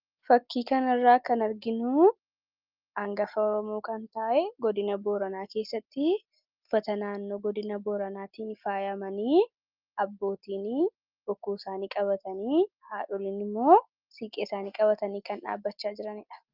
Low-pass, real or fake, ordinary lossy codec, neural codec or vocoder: 5.4 kHz; real; Opus, 24 kbps; none